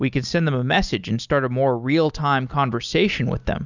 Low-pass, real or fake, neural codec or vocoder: 7.2 kHz; real; none